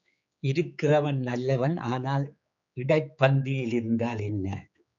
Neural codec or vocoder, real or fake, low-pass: codec, 16 kHz, 4 kbps, X-Codec, HuBERT features, trained on general audio; fake; 7.2 kHz